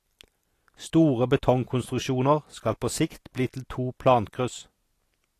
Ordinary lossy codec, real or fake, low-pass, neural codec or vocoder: AAC, 48 kbps; real; 14.4 kHz; none